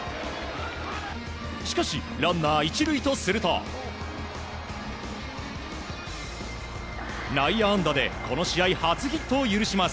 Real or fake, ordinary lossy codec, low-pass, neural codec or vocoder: real; none; none; none